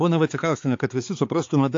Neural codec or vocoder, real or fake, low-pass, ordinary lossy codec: codec, 16 kHz, 4 kbps, X-Codec, HuBERT features, trained on balanced general audio; fake; 7.2 kHz; AAC, 48 kbps